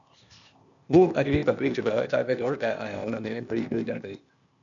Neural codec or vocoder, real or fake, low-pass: codec, 16 kHz, 0.8 kbps, ZipCodec; fake; 7.2 kHz